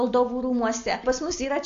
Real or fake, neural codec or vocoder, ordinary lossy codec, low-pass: real; none; AAC, 48 kbps; 7.2 kHz